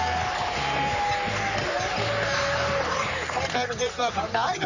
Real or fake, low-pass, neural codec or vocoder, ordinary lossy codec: fake; 7.2 kHz; codec, 44.1 kHz, 3.4 kbps, Pupu-Codec; none